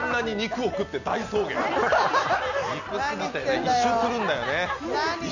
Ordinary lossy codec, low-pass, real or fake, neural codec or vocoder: none; 7.2 kHz; real; none